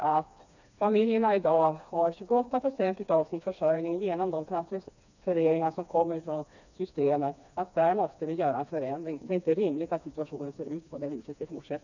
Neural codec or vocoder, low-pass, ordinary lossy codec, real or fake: codec, 16 kHz, 2 kbps, FreqCodec, smaller model; 7.2 kHz; none; fake